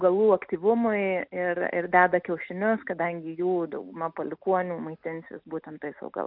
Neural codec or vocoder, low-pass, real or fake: none; 5.4 kHz; real